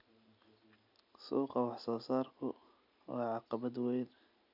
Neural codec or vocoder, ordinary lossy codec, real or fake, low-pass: none; none; real; 5.4 kHz